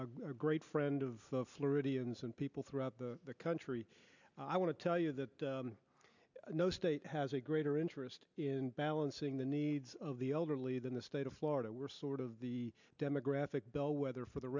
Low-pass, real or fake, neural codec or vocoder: 7.2 kHz; real; none